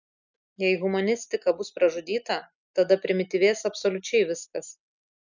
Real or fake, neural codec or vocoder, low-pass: real; none; 7.2 kHz